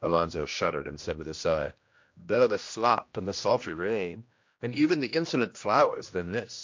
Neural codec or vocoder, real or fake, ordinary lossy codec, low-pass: codec, 16 kHz, 1 kbps, X-Codec, HuBERT features, trained on general audio; fake; MP3, 48 kbps; 7.2 kHz